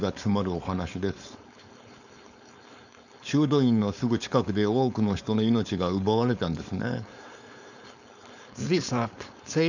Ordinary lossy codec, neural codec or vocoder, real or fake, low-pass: none; codec, 16 kHz, 4.8 kbps, FACodec; fake; 7.2 kHz